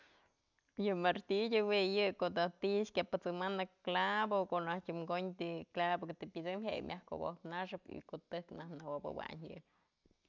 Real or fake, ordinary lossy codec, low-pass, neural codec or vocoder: real; none; 7.2 kHz; none